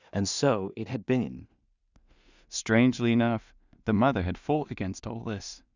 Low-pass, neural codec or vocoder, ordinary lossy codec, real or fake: 7.2 kHz; codec, 16 kHz in and 24 kHz out, 0.4 kbps, LongCat-Audio-Codec, two codebook decoder; Opus, 64 kbps; fake